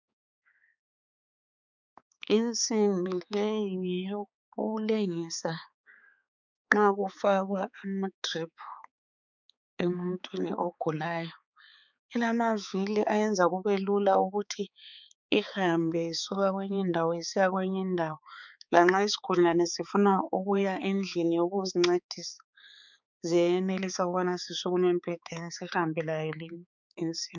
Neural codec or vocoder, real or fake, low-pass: codec, 16 kHz, 4 kbps, X-Codec, HuBERT features, trained on balanced general audio; fake; 7.2 kHz